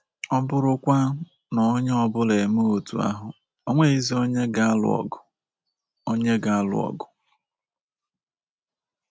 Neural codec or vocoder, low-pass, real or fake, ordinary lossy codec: none; none; real; none